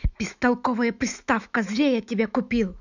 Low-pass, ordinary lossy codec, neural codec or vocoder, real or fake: 7.2 kHz; none; autoencoder, 48 kHz, 128 numbers a frame, DAC-VAE, trained on Japanese speech; fake